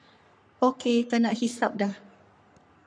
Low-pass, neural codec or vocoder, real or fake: 9.9 kHz; codec, 44.1 kHz, 3.4 kbps, Pupu-Codec; fake